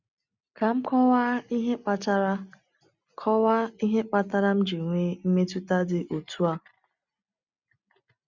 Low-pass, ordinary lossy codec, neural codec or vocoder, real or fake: 7.2 kHz; none; none; real